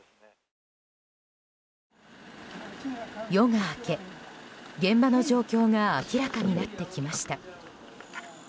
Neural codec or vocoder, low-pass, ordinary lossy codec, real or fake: none; none; none; real